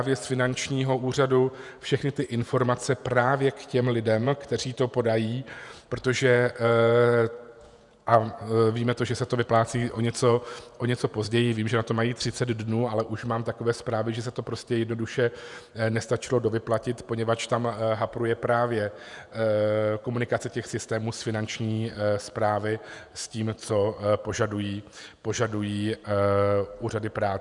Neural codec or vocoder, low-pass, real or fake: vocoder, 48 kHz, 128 mel bands, Vocos; 10.8 kHz; fake